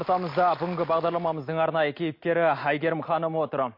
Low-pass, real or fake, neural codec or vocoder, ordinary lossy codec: 5.4 kHz; real; none; MP3, 32 kbps